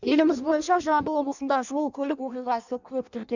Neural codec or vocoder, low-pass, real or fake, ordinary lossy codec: codec, 16 kHz in and 24 kHz out, 0.6 kbps, FireRedTTS-2 codec; 7.2 kHz; fake; none